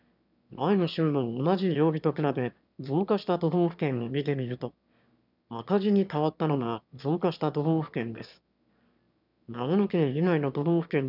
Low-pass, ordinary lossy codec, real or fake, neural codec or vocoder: 5.4 kHz; none; fake; autoencoder, 22.05 kHz, a latent of 192 numbers a frame, VITS, trained on one speaker